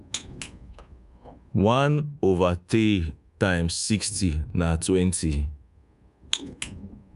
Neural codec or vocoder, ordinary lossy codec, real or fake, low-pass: codec, 24 kHz, 1.2 kbps, DualCodec; none; fake; 10.8 kHz